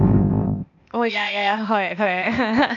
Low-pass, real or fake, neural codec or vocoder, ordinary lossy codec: 7.2 kHz; fake; codec, 16 kHz, 0.8 kbps, ZipCodec; none